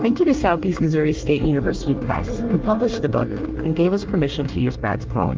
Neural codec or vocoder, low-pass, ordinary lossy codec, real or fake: codec, 24 kHz, 1 kbps, SNAC; 7.2 kHz; Opus, 24 kbps; fake